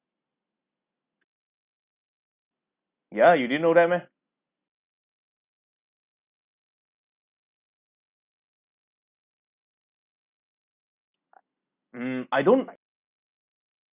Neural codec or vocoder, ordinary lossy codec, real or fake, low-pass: none; Opus, 64 kbps; real; 3.6 kHz